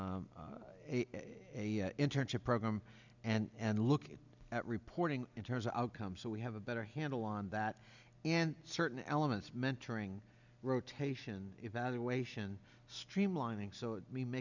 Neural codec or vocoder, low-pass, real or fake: none; 7.2 kHz; real